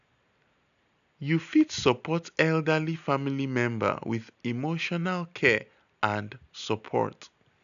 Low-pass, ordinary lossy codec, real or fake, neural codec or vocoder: 7.2 kHz; none; real; none